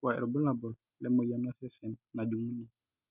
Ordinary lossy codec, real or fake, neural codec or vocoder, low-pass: none; real; none; 3.6 kHz